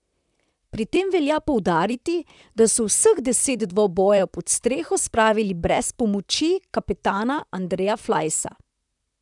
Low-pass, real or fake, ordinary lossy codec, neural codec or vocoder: 10.8 kHz; fake; none; vocoder, 44.1 kHz, 128 mel bands, Pupu-Vocoder